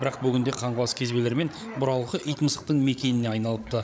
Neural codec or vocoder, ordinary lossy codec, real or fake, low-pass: codec, 16 kHz, 8 kbps, FreqCodec, larger model; none; fake; none